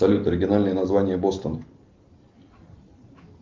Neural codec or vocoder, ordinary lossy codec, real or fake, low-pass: none; Opus, 32 kbps; real; 7.2 kHz